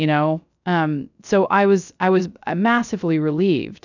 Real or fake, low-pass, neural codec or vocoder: fake; 7.2 kHz; codec, 16 kHz, 0.3 kbps, FocalCodec